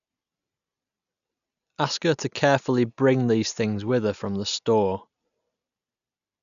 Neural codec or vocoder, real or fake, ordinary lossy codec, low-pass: none; real; none; 7.2 kHz